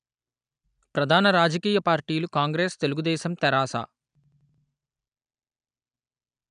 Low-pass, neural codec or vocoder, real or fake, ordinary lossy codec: 9.9 kHz; none; real; none